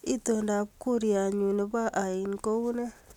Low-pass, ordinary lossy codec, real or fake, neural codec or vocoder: 19.8 kHz; none; real; none